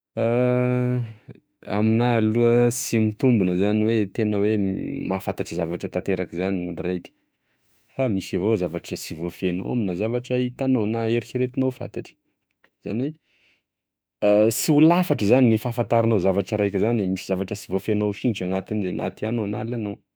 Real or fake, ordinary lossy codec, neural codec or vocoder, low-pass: fake; none; autoencoder, 48 kHz, 32 numbers a frame, DAC-VAE, trained on Japanese speech; none